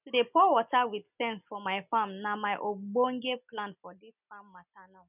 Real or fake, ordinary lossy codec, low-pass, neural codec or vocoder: real; none; 3.6 kHz; none